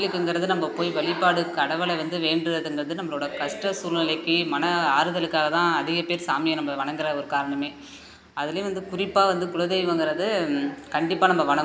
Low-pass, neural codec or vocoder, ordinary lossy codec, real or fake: none; none; none; real